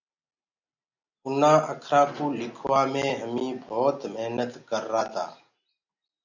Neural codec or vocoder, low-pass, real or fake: none; 7.2 kHz; real